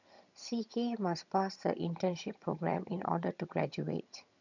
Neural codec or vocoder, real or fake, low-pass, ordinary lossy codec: vocoder, 22.05 kHz, 80 mel bands, HiFi-GAN; fake; 7.2 kHz; none